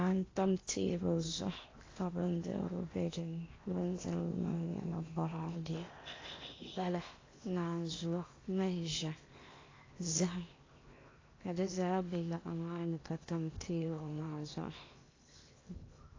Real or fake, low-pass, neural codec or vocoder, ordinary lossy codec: fake; 7.2 kHz; codec, 16 kHz in and 24 kHz out, 0.8 kbps, FocalCodec, streaming, 65536 codes; AAC, 32 kbps